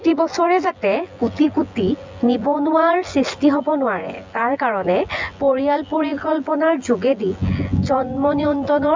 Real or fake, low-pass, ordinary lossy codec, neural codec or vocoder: fake; 7.2 kHz; MP3, 64 kbps; vocoder, 24 kHz, 100 mel bands, Vocos